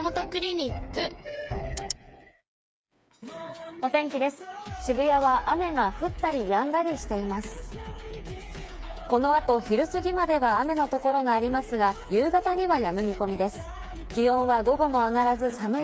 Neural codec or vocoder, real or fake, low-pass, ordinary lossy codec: codec, 16 kHz, 4 kbps, FreqCodec, smaller model; fake; none; none